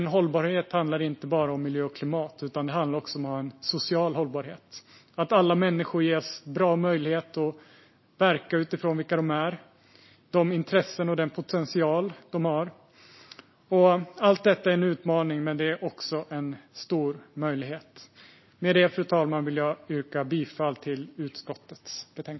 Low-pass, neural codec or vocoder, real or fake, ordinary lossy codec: 7.2 kHz; none; real; MP3, 24 kbps